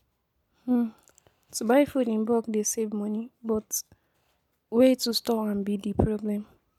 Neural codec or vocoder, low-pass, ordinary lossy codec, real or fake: none; 19.8 kHz; none; real